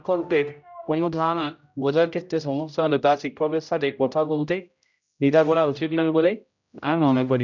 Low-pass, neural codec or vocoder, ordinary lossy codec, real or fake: 7.2 kHz; codec, 16 kHz, 0.5 kbps, X-Codec, HuBERT features, trained on general audio; none; fake